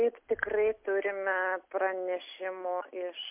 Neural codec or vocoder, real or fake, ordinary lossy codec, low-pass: none; real; MP3, 32 kbps; 3.6 kHz